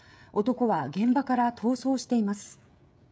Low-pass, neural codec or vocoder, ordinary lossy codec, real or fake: none; codec, 16 kHz, 16 kbps, FreqCodec, smaller model; none; fake